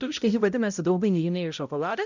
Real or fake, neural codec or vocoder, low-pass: fake; codec, 16 kHz, 0.5 kbps, X-Codec, HuBERT features, trained on balanced general audio; 7.2 kHz